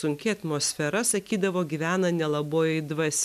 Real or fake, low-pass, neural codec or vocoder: real; 14.4 kHz; none